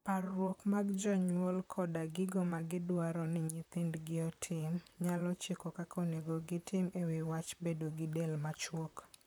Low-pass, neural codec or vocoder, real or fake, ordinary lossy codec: none; vocoder, 44.1 kHz, 128 mel bands, Pupu-Vocoder; fake; none